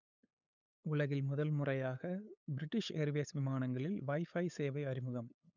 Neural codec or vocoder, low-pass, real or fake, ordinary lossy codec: codec, 16 kHz, 8 kbps, FunCodec, trained on LibriTTS, 25 frames a second; 7.2 kHz; fake; none